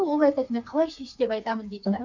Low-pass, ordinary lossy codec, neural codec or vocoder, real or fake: 7.2 kHz; none; codec, 16 kHz, 4 kbps, FreqCodec, smaller model; fake